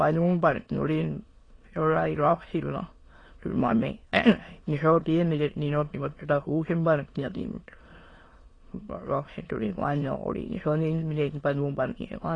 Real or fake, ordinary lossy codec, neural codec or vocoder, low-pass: fake; AAC, 32 kbps; autoencoder, 22.05 kHz, a latent of 192 numbers a frame, VITS, trained on many speakers; 9.9 kHz